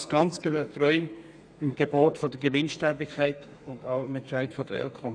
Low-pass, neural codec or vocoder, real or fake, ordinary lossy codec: 9.9 kHz; codec, 32 kHz, 1.9 kbps, SNAC; fake; none